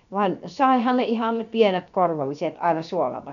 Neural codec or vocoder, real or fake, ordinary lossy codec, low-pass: codec, 16 kHz, 0.7 kbps, FocalCodec; fake; none; 7.2 kHz